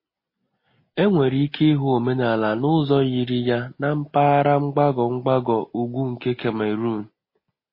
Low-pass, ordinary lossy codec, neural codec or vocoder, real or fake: 5.4 kHz; MP3, 24 kbps; none; real